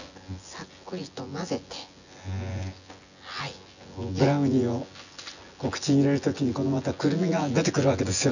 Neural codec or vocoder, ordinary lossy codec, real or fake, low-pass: vocoder, 24 kHz, 100 mel bands, Vocos; none; fake; 7.2 kHz